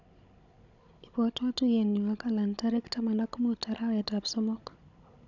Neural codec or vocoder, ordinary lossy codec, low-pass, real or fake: codec, 16 kHz, 16 kbps, FunCodec, trained on Chinese and English, 50 frames a second; none; 7.2 kHz; fake